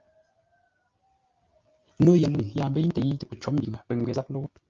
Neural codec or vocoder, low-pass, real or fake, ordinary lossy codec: none; 7.2 kHz; real; Opus, 24 kbps